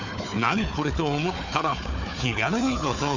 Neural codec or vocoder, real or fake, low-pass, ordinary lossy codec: codec, 16 kHz, 4 kbps, FunCodec, trained on Chinese and English, 50 frames a second; fake; 7.2 kHz; none